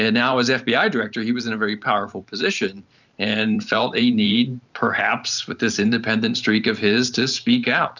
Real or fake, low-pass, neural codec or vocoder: fake; 7.2 kHz; vocoder, 44.1 kHz, 128 mel bands every 512 samples, BigVGAN v2